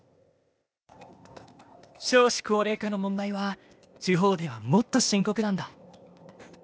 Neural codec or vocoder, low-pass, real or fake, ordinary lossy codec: codec, 16 kHz, 0.8 kbps, ZipCodec; none; fake; none